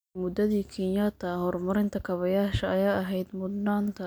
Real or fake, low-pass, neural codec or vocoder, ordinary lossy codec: real; none; none; none